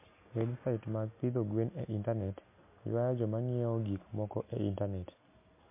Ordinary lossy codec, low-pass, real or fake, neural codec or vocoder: MP3, 24 kbps; 3.6 kHz; real; none